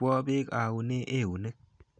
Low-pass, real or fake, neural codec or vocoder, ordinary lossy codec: 9.9 kHz; real; none; none